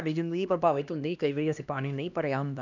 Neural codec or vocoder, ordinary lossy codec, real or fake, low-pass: codec, 16 kHz, 1 kbps, X-Codec, HuBERT features, trained on LibriSpeech; none; fake; 7.2 kHz